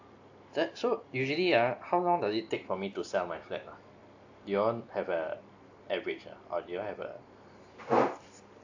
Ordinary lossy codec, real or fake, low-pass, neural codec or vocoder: AAC, 48 kbps; real; 7.2 kHz; none